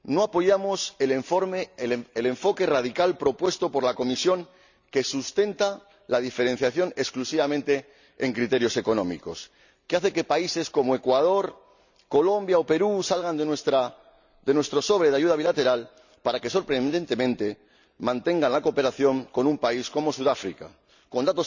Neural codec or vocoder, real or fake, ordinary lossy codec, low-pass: none; real; none; 7.2 kHz